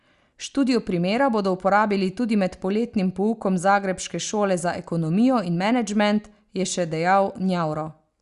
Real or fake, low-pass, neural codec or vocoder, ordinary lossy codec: real; 10.8 kHz; none; Opus, 64 kbps